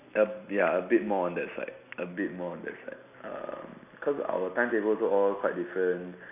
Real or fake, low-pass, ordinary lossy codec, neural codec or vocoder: real; 3.6 kHz; none; none